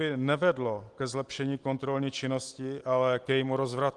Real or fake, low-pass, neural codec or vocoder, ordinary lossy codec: real; 10.8 kHz; none; Opus, 24 kbps